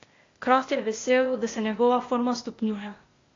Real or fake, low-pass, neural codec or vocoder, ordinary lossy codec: fake; 7.2 kHz; codec, 16 kHz, 0.8 kbps, ZipCodec; AAC, 32 kbps